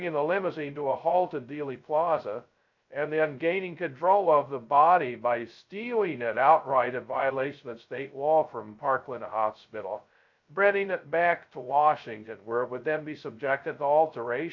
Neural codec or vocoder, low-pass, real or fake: codec, 16 kHz, 0.2 kbps, FocalCodec; 7.2 kHz; fake